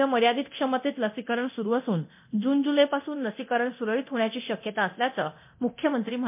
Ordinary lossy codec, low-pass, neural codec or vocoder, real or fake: MP3, 32 kbps; 3.6 kHz; codec, 24 kHz, 0.9 kbps, DualCodec; fake